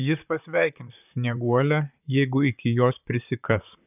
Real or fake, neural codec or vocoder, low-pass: fake; vocoder, 44.1 kHz, 128 mel bands, Pupu-Vocoder; 3.6 kHz